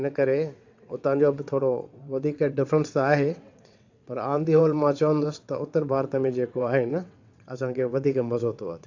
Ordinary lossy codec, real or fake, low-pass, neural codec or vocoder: AAC, 48 kbps; fake; 7.2 kHz; vocoder, 22.05 kHz, 80 mel bands, WaveNeXt